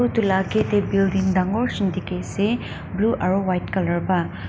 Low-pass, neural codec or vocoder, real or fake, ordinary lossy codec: none; none; real; none